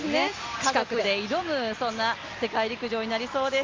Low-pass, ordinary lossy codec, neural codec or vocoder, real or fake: 7.2 kHz; Opus, 32 kbps; none; real